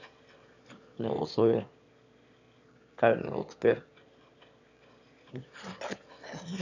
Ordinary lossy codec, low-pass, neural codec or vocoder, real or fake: none; 7.2 kHz; autoencoder, 22.05 kHz, a latent of 192 numbers a frame, VITS, trained on one speaker; fake